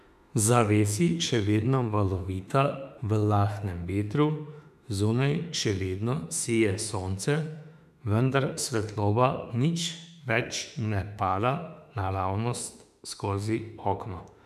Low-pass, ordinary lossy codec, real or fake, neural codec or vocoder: 14.4 kHz; none; fake; autoencoder, 48 kHz, 32 numbers a frame, DAC-VAE, trained on Japanese speech